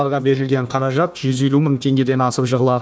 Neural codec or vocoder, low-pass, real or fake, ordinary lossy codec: codec, 16 kHz, 1 kbps, FunCodec, trained on Chinese and English, 50 frames a second; none; fake; none